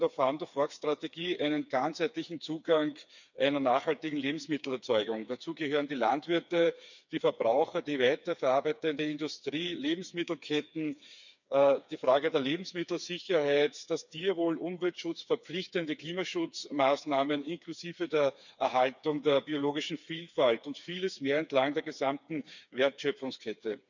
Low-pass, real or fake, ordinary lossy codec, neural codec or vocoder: 7.2 kHz; fake; none; codec, 16 kHz, 4 kbps, FreqCodec, smaller model